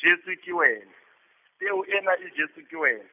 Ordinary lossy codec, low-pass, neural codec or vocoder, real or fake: none; 3.6 kHz; none; real